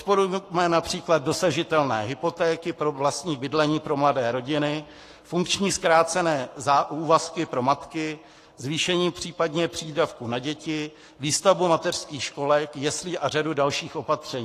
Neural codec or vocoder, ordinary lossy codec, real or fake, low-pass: codec, 44.1 kHz, 7.8 kbps, Pupu-Codec; AAC, 48 kbps; fake; 14.4 kHz